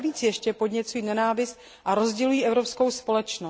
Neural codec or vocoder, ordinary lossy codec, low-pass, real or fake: none; none; none; real